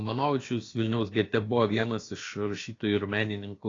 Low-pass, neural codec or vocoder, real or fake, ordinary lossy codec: 7.2 kHz; codec, 16 kHz, about 1 kbps, DyCAST, with the encoder's durations; fake; AAC, 32 kbps